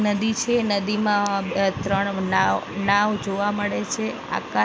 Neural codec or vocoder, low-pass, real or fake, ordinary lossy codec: none; none; real; none